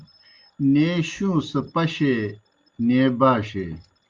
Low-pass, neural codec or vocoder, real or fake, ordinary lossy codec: 7.2 kHz; none; real; Opus, 24 kbps